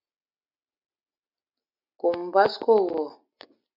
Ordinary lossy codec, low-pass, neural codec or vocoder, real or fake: MP3, 48 kbps; 5.4 kHz; none; real